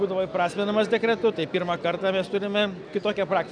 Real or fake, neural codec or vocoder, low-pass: real; none; 9.9 kHz